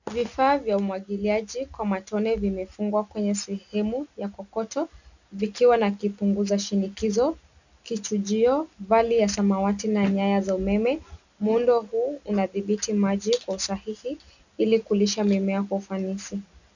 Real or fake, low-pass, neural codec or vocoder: real; 7.2 kHz; none